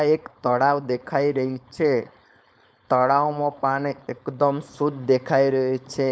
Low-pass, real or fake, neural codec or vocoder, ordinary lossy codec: none; fake; codec, 16 kHz, 4.8 kbps, FACodec; none